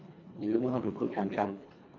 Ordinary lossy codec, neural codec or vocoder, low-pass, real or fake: none; codec, 24 kHz, 1.5 kbps, HILCodec; 7.2 kHz; fake